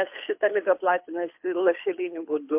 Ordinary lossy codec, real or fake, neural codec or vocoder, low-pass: MP3, 32 kbps; fake; codec, 24 kHz, 6 kbps, HILCodec; 3.6 kHz